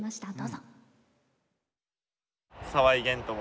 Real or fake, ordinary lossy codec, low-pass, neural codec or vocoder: real; none; none; none